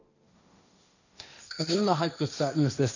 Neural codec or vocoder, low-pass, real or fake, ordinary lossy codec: codec, 16 kHz, 1.1 kbps, Voila-Tokenizer; 7.2 kHz; fake; none